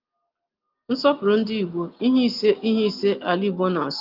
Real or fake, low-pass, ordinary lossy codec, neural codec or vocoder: real; 5.4 kHz; Opus, 24 kbps; none